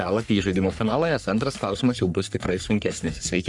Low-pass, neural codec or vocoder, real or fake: 10.8 kHz; codec, 44.1 kHz, 3.4 kbps, Pupu-Codec; fake